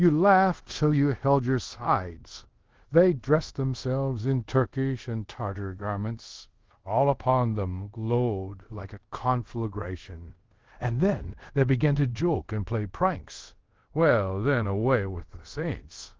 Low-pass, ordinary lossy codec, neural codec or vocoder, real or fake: 7.2 kHz; Opus, 16 kbps; codec, 24 kHz, 0.5 kbps, DualCodec; fake